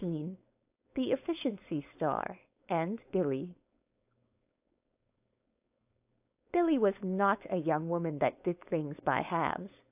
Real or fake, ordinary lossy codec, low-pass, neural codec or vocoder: fake; AAC, 32 kbps; 3.6 kHz; codec, 16 kHz, 4.8 kbps, FACodec